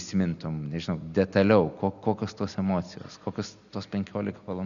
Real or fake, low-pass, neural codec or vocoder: real; 7.2 kHz; none